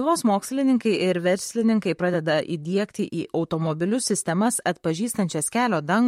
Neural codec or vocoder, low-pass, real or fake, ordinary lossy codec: vocoder, 44.1 kHz, 128 mel bands, Pupu-Vocoder; 19.8 kHz; fake; MP3, 64 kbps